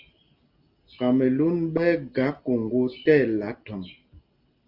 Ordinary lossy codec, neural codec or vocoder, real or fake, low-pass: Opus, 24 kbps; none; real; 5.4 kHz